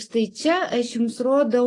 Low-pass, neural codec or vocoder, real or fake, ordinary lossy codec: 10.8 kHz; none; real; AAC, 32 kbps